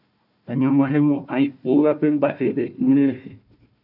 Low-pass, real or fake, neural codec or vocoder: 5.4 kHz; fake; codec, 16 kHz, 1 kbps, FunCodec, trained on Chinese and English, 50 frames a second